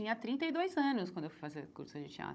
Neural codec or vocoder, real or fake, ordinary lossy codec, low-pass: codec, 16 kHz, 16 kbps, FunCodec, trained on Chinese and English, 50 frames a second; fake; none; none